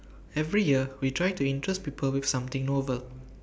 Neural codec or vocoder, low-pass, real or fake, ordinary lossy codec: none; none; real; none